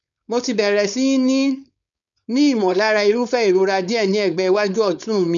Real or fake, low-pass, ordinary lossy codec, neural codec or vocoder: fake; 7.2 kHz; none; codec, 16 kHz, 4.8 kbps, FACodec